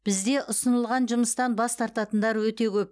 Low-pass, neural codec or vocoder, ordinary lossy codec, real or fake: none; none; none; real